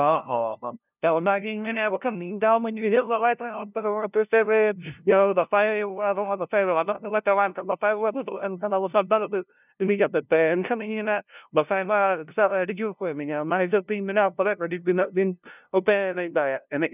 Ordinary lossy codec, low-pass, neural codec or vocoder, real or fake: none; 3.6 kHz; codec, 16 kHz, 0.5 kbps, FunCodec, trained on LibriTTS, 25 frames a second; fake